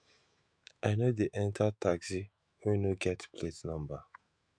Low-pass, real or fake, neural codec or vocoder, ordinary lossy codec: 9.9 kHz; fake; autoencoder, 48 kHz, 128 numbers a frame, DAC-VAE, trained on Japanese speech; none